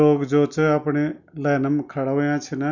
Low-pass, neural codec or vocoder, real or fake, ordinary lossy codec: 7.2 kHz; none; real; none